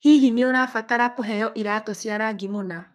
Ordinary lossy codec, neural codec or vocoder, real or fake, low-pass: none; codec, 32 kHz, 1.9 kbps, SNAC; fake; 14.4 kHz